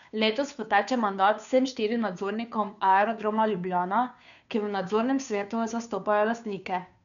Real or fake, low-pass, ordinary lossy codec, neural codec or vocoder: fake; 7.2 kHz; none; codec, 16 kHz, 2 kbps, FunCodec, trained on Chinese and English, 25 frames a second